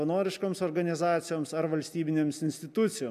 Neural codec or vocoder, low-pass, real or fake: none; 14.4 kHz; real